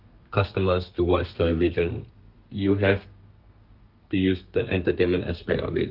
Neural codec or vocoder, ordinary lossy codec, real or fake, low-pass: codec, 32 kHz, 1.9 kbps, SNAC; Opus, 32 kbps; fake; 5.4 kHz